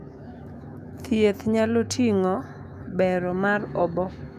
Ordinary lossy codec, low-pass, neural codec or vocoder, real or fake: none; 14.4 kHz; autoencoder, 48 kHz, 128 numbers a frame, DAC-VAE, trained on Japanese speech; fake